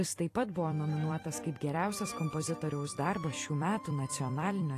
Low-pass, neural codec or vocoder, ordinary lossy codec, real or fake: 14.4 kHz; vocoder, 44.1 kHz, 128 mel bands every 256 samples, BigVGAN v2; AAC, 48 kbps; fake